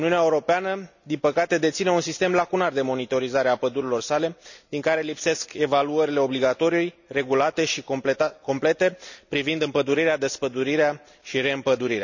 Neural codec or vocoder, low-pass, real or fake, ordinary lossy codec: none; 7.2 kHz; real; none